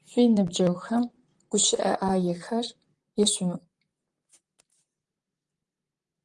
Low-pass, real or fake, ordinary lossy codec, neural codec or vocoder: 10.8 kHz; fake; Opus, 32 kbps; vocoder, 44.1 kHz, 128 mel bands, Pupu-Vocoder